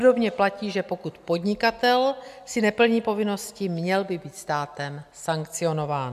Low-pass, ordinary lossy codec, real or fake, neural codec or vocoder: 14.4 kHz; MP3, 96 kbps; real; none